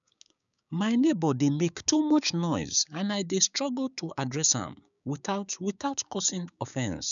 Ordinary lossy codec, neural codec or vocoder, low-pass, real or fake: none; codec, 16 kHz, 6 kbps, DAC; 7.2 kHz; fake